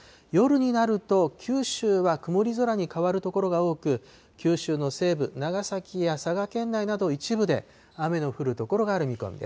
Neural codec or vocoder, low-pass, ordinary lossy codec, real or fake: none; none; none; real